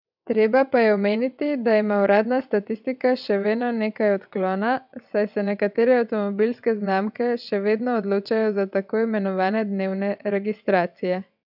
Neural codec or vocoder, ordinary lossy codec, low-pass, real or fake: vocoder, 22.05 kHz, 80 mel bands, WaveNeXt; none; 5.4 kHz; fake